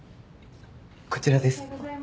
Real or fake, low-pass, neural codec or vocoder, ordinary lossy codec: real; none; none; none